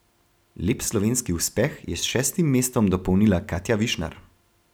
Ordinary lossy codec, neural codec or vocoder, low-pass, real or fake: none; none; none; real